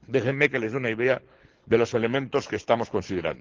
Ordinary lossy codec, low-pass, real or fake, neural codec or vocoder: Opus, 16 kbps; 7.2 kHz; fake; codec, 24 kHz, 6 kbps, HILCodec